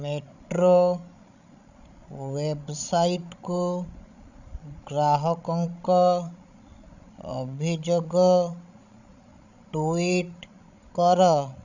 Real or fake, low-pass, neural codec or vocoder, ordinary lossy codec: fake; 7.2 kHz; codec, 16 kHz, 16 kbps, FreqCodec, larger model; none